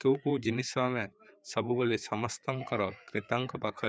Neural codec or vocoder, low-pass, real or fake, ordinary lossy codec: codec, 16 kHz, 4 kbps, FreqCodec, larger model; none; fake; none